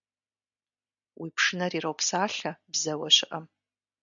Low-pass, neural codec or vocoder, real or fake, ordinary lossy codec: 7.2 kHz; none; real; MP3, 96 kbps